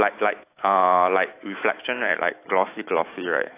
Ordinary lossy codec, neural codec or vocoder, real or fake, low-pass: AAC, 24 kbps; autoencoder, 48 kHz, 128 numbers a frame, DAC-VAE, trained on Japanese speech; fake; 3.6 kHz